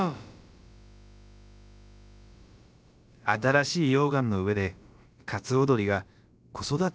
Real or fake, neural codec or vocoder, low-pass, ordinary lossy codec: fake; codec, 16 kHz, about 1 kbps, DyCAST, with the encoder's durations; none; none